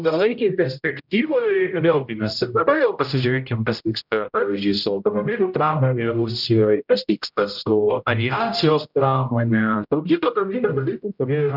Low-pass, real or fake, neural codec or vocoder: 5.4 kHz; fake; codec, 16 kHz, 0.5 kbps, X-Codec, HuBERT features, trained on general audio